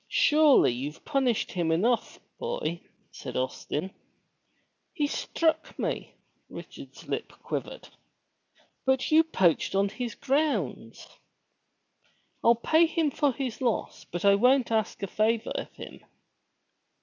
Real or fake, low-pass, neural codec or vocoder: real; 7.2 kHz; none